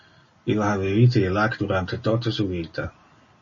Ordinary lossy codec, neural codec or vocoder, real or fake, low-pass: MP3, 32 kbps; none; real; 7.2 kHz